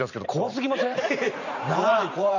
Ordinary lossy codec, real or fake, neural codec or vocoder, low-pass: none; fake; vocoder, 44.1 kHz, 128 mel bands every 512 samples, BigVGAN v2; 7.2 kHz